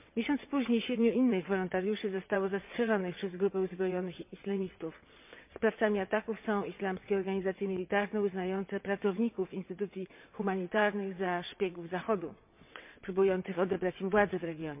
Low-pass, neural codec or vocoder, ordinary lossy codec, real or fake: 3.6 kHz; vocoder, 22.05 kHz, 80 mel bands, WaveNeXt; MP3, 32 kbps; fake